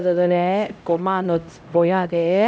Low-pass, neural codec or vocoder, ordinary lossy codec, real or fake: none; codec, 16 kHz, 0.5 kbps, X-Codec, HuBERT features, trained on LibriSpeech; none; fake